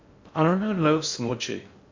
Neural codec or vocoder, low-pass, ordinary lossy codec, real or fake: codec, 16 kHz in and 24 kHz out, 0.6 kbps, FocalCodec, streaming, 4096 codes; 7.2 kHz; MP3, 48 kbps; fake